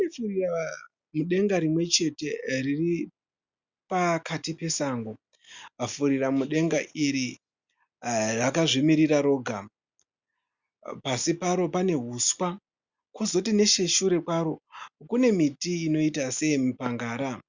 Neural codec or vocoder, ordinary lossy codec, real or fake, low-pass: none; Opus, 64 kbps; real; 7.2 kHz